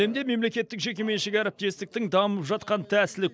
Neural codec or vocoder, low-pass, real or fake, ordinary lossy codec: none; none; real; none